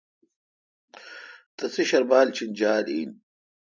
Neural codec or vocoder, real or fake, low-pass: none; real; 7.2 kHz